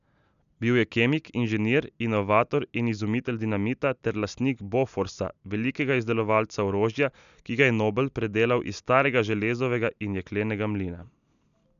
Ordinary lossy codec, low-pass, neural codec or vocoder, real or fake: none; 7.2 kHz; none; real